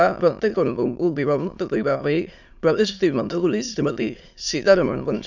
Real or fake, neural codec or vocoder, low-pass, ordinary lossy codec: fake; autoencoder, 22.05 kHz, a latent of 192 numbers a frame, VITS, trained on many speakers; 7.2 kHz; none